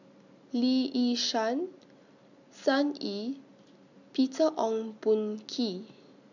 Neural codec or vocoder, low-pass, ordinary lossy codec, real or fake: none; 7.2 kHz; none; real